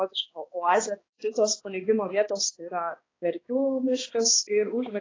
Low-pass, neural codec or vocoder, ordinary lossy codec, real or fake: 7.2 kHz; codec, 16 kHz, 2 kbps, X-Codec, HuBERT features, trained on balanced general audio; AAC, 32 kbps; fake